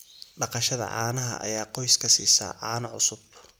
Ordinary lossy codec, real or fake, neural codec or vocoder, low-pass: none; real; none; none